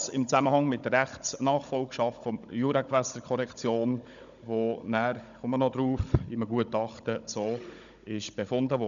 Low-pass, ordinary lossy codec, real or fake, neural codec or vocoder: 7.2 kHz; none; fake; codec, 16 kHz, 16 kbps, FunCodec, trained on Chinese and English, 50 frames a second